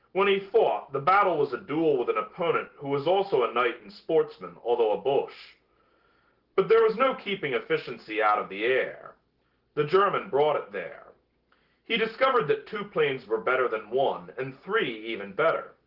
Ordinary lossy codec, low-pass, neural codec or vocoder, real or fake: Opus, 16 kbps; 5.4 kHz; none; real